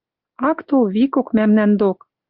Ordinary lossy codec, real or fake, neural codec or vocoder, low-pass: Opus, 32 kbps; real; none; 5.4 kHz